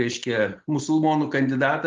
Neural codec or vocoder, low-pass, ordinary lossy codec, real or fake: none; 7.2 kHz; Opus, 32 kbps; real